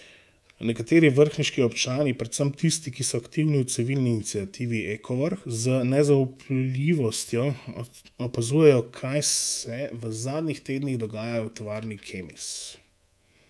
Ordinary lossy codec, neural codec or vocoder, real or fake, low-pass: none; codec, 24 kHz, 3.1 kbps, DualCodec; fake; none